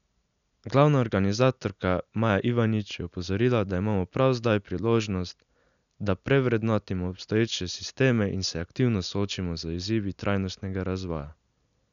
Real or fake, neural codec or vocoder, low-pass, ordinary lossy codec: real; none; 7.2 kHz; none